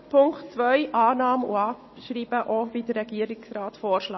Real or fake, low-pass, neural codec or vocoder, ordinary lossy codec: real; 7.2 kHz; none; MP3, 24 kbps